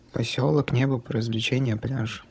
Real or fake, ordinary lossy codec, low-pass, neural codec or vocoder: fake; none; none; codec, 16 kHz, 16 kbps, FunCodec, trained on Chinese and English, 50 frames a second